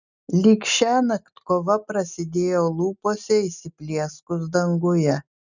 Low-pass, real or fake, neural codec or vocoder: 7.2 kHz; real; none